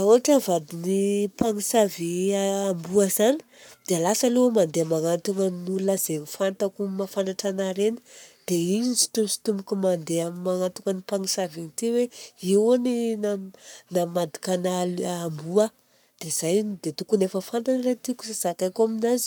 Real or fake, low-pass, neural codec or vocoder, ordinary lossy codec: fake; none; codec, 44.1 kHz, 7.8 kbps, Pupu-Codec; none